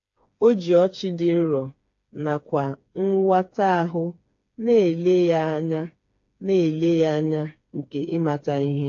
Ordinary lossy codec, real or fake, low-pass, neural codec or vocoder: AAC, 48 kbps; fake; 7.2 kHz; codec, 16 kHz, 4 kbps, FreqCodec, smaller model